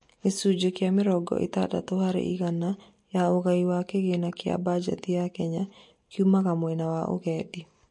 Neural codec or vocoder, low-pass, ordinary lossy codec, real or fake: none; 10.8 kHz; MP3, 48 kbps; real